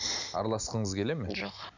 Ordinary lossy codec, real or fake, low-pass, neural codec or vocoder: none; real; 7.2 kHz; none